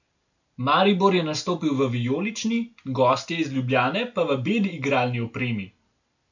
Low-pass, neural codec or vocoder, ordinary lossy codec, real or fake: 7.2 kHz; none; none; real